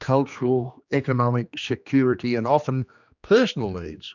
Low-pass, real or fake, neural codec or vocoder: 7.2 kHz; fake; codec, 16 kHz, 2 kbps, X-Codec, HuBERT features, trained on general audio